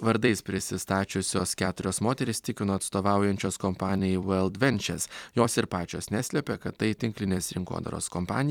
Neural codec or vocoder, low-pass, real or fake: vocoder, 44.1 kHz, 128 mel bands every 256 samples, BigVGAN v2; 19.8 kHz; fake